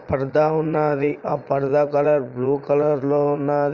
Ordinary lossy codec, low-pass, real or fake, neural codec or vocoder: Opus, 64 kbps; 7.2 kHz; fake; vocoder, 22.05 kHz, 80 mel bands, Vocos